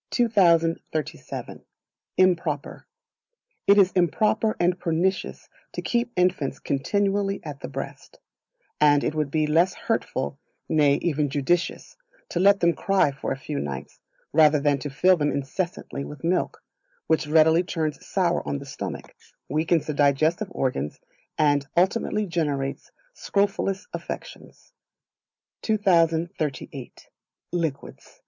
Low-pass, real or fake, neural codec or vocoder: 7.2 kHz; real; none